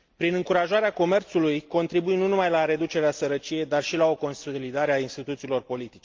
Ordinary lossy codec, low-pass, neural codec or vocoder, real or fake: Opus, 32 kbps; 7.2 kHz; none; real